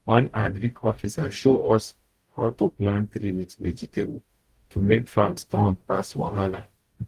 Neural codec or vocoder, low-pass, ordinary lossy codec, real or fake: codec, 44.1 kHz, 0.9 kbps, DAC; 14.4 kHz; Opus, 24 kbps; fake